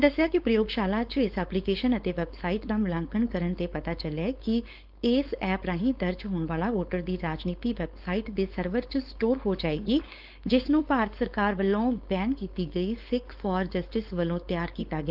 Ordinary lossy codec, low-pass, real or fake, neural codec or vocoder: Opus, 24 kbps; 5.4 kHz; fake; codec, 16 kHz, 4.8 kbps, FACodec